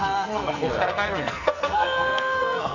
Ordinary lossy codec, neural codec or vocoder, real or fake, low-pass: none; codec, 44.1 kHz, 2.6 kbps, SNAC; fake; 7.2 kHz